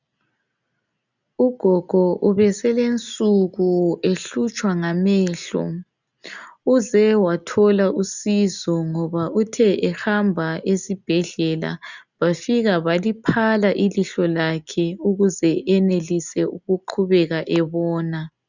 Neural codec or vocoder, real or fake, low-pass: none; real; 7.2 kHz